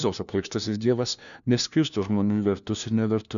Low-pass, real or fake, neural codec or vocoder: 7.2 kHz; fake; codec, 16 kHz, 1 kbps, FunCodec, trained on LibriTTS, 50 frames a second